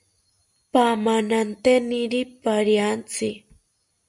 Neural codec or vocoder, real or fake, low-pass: none; real; 10.8 kHz